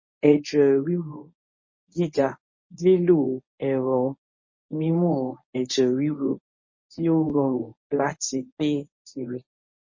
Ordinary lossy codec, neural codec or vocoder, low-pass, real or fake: MP3, 32 kbps; codec, 24 kHz, 0.9 kbps, WavTokenizer, medium speech release version 1; 7.2 kHz; fake